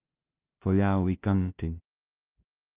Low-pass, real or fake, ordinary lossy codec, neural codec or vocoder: 3.6 kHz; fake; Opus, 32 kbps; codec, 16 kHz, 0.5 kbps, FunCodec, trained on LibriTTS, 25 frames a second